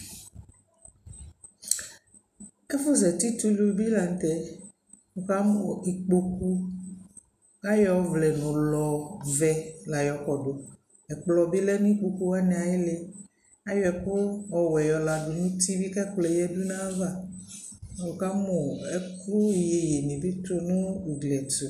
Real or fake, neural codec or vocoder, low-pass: real; none; 14.4 kHz